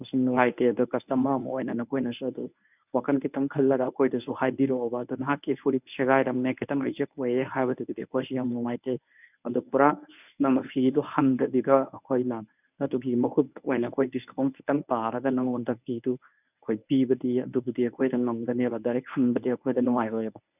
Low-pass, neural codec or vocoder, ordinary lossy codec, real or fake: 3.6 kHz; codec, 24 kHz, 0.9 kbps, WavTokenizer, medium speech release version 1; none; fake